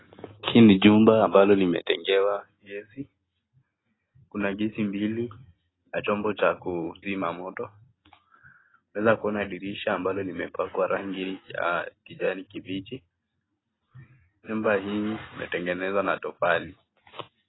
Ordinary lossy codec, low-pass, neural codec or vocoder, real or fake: AAC, 16 kbps; 7.2 kHz; none; real